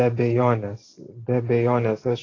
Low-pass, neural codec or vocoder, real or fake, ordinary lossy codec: 7.2 kHz; none; real; AAC, 32 kbps